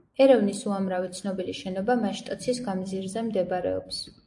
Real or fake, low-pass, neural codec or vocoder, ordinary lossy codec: fake; 10.8 kHz; vocoder, 44.1 kHz, 128 mel bands every 256 samples, BigVGAN v2; AAC, 64 kbps